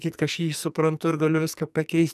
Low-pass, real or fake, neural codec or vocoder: 14.4 kHz; fake; codec, 44.1 kHz, 2.6 kbps, SNAC